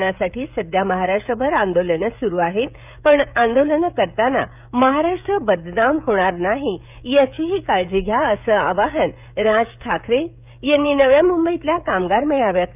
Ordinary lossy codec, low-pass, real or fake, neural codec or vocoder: none; 3.6 kHz; fake; codec, 16 kHz, 16 kbps, FreqCodec, smaller model